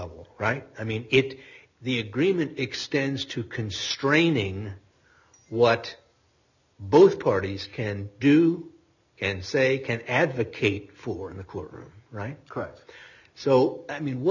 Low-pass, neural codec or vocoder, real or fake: 7.2 kHz; none; real